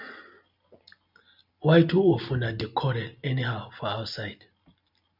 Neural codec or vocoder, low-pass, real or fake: none; 5.4 kHz; real